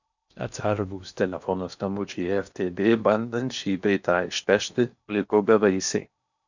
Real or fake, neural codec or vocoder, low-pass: fake; codec, 16 kHz in and 24 kHz out, 0.8 kbps, FocalCodec, streaming, 65536 codes; 7.2 kHz